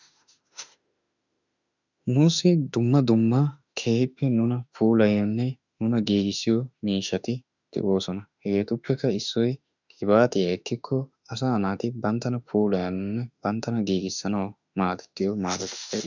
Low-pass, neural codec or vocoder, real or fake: 7.2 kHz; autoencoder, 48 kHz, 32 numbers a frame, DAC-VAE, trained on Japanese speech; fake